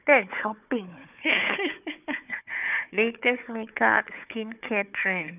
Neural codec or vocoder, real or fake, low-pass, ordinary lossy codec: codec, 16 kHz, 4 kbps, FunCodec, trained on Chinese and English, 50 frames a second; fake; 3.6 kHz; none